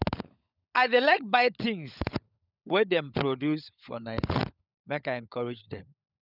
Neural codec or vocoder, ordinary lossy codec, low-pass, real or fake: codec, 16 kHz, 16 kbps, FunCodec, trained on LibriTTS, 50 frames a second; none; 5.4 kHz; fake